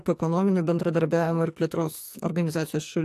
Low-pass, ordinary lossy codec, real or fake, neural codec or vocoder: 14.4 kHz; AAC, 64 kbps; fake; codec, 44.1 kHz, 2.6 kbps, SNAC